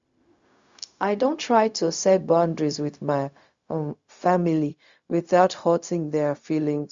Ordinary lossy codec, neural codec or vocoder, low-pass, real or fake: Opus, 64 kbps; codec, 16 kHz, 0.4 kbps, LongCat-Audio-Codec; 7.2 kHz; fake